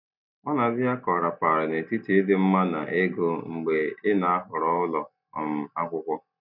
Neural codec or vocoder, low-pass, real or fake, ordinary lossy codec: none; 5.4 kHz; real; none